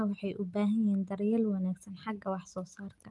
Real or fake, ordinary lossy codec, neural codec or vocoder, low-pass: real; Opus, 32 kbps; none; 10.8 kHz